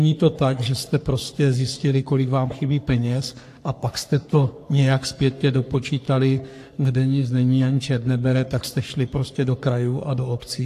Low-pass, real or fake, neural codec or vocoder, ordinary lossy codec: 14.4 kHz; fake; codec, 44.1 kHz, 3.4 kbps, Pupu-Codec; AAC, 64 kbps